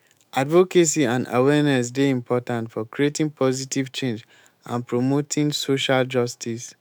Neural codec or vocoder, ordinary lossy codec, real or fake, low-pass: autoencoder, 48 kHz, 128 numbers a frame, DAC-VAE, trained on Japanese speech; none; fake; none